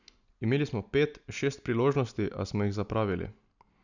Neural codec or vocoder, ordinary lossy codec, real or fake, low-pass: none; none; real; 7.2 kHz